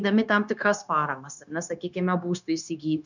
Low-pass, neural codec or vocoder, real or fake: 7.2 kHz; codec, 16 kHz, 0.9 kbps, LongCat-Audio-Codec; fake